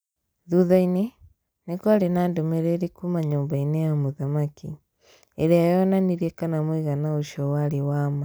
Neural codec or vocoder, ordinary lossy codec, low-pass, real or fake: none; none; none; real